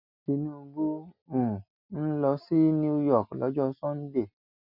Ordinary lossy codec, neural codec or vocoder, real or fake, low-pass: none; none; real; 5.4 kHz